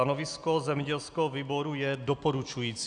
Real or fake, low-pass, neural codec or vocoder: real; 9.9 kHz; none